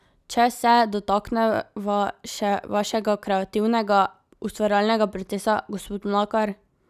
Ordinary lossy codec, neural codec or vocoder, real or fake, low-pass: none; none; real; 14.4 kHz